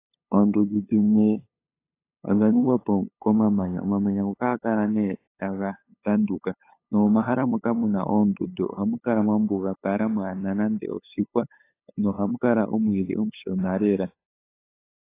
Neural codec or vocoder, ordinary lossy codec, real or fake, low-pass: codec, 16 kHz, 8 kbps, FunCodec, trained on LibriTTS, 25 frames a second; AAC, 24 kbps; fake; 3.6 kHz